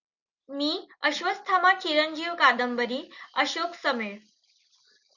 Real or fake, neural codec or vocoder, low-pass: real; none; 7.2 kHz